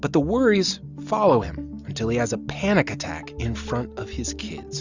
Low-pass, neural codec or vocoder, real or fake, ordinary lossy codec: 7.2 kHz; none; real; Opus, 64 kbps